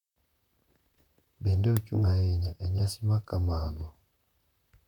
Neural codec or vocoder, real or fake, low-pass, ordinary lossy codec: vocoder, 44.1 kHz, 128 mel bands, Pupu-Vocoder; fake; 19.8 kHz; none